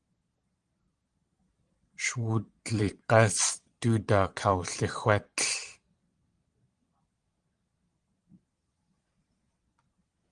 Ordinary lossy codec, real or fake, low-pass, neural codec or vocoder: Opus, 24 kbps; fake; 9.9 kHz; vocoder, 22.05 kHz, 80 mel bands, Vocos